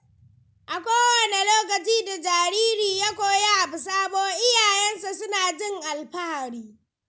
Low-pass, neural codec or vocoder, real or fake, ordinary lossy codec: none; none; real; none